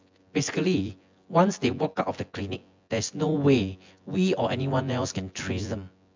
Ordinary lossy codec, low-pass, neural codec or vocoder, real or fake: MP3, 64 kbps; 7.2 kHz; vocoder, 24 kHz, 100 mel bands, Vocos; fake